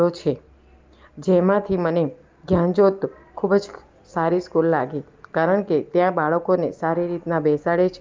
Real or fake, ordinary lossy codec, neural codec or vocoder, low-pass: real; Opus, 32 kbps; none; 7.2 kHz